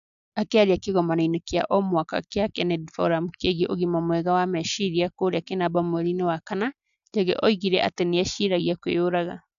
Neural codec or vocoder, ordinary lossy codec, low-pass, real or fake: none; none; 7.2 kHz; real